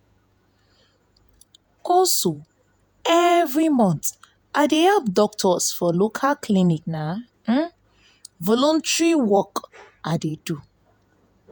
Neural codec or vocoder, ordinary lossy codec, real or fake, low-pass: vocoder, 48 kHz, 128 mel bands, Vocos; none; fake; none